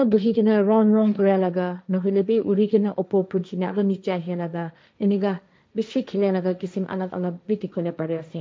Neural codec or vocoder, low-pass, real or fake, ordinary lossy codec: codec, 16 kHz, 1.1 kbps, Voila-Tokenizer; none; fake; none